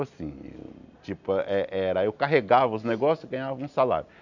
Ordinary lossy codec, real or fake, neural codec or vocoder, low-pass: none; real; none; 7.2 kHz